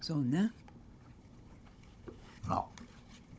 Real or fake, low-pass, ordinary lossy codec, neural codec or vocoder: fake; none; none; codec, 16 kHz, 16 kbps, FunCodec, trained on Chinese and English, 50 frames a second